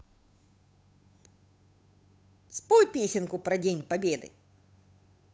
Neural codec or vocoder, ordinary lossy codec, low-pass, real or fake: codec, 16 kHz, 8 kbps, FunCodec, trained on Chinese and English, 25 frames a second; none; none; fake